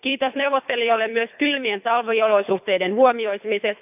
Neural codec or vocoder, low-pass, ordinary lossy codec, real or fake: codec, 24 kHz, 3 kbps, HILCodec; 3.6 kHz; none; fake